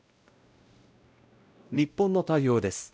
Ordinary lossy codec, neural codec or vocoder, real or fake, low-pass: none; codec, 16 kHz, 0.5 kbps, X-Codec, WavLM features, trained on Multilingual LibriSpeech; fake; none